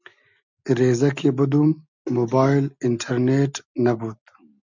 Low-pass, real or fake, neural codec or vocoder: 7.2 kHz; real; none